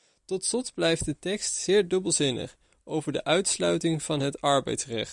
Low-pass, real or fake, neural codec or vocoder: 10.8 kHz; fake; vocoder, 44.1 kHz, 128 mel bands every 256 samples, BigVGAN v2